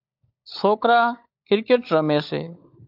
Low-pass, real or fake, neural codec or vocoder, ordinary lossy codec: 5.4 kHz; fake; codec, 16 kHz, 16 kbps, FunCodec, trained on LibriTTS, 50 frames a second; AAC, 48 kbps